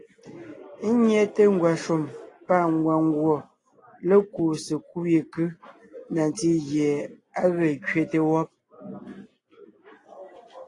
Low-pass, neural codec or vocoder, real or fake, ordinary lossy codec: 10.8 kHz; none; real; AAC, 32 kbps